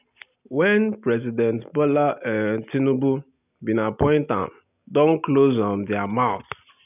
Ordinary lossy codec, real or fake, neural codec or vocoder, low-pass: none; real; none; 3.6 kHz